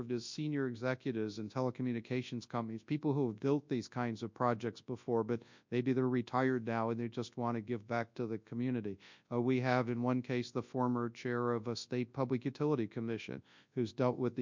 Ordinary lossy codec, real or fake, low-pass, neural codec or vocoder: MP3, 64 kbps; fake; 7.2 kHz; codec, 24 kHz, 0.9 kbps, WavTokenizer, large speech release